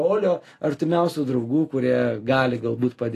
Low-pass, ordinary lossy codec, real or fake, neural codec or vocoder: 14.4 kHz; AAC, 48 kbps; real; none